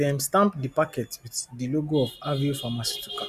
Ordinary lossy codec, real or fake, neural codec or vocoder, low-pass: none; real; none; 14.4 kHz